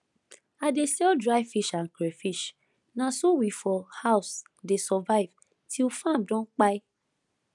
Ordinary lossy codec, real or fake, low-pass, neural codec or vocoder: none; real; 10.8 kHz; none